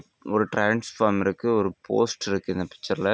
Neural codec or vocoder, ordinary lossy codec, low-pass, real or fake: none; none; none; real